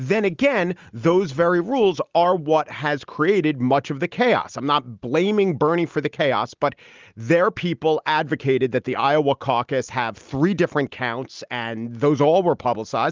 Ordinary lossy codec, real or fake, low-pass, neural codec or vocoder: Opus, 32 kbps; real; 7.2 kHz; none